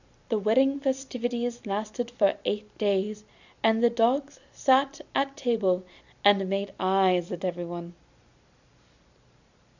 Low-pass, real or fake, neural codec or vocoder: 7.2 kHz; fake; vocoder, 44.1 kHz, 128 mel bands every 256 samples, BigVGAN v2